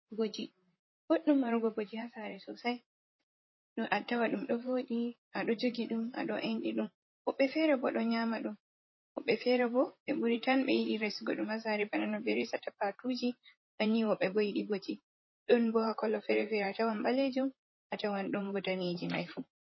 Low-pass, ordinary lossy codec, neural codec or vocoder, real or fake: 7.2 kHz; MP3, 24 kbps; vocoder, 44.1 kHz, 128 mel bands, Pupu-Vocoder; fake